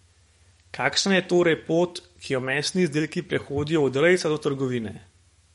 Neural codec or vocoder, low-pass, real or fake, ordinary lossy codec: codec, 44.1 kHz, 7.8 kbps, DAC; 19.8 kHz; fake; MP3, 48 kbps